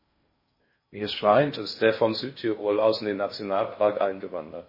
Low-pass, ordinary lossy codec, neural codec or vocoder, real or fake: 5.4 kHz; MP3, 24 kbps; codec, 16 kHz in and 24 kHz out, 0.6 kbps, FocalCodec, streaming, 4096 codes; fake